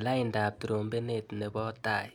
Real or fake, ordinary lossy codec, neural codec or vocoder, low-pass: real; none; none; none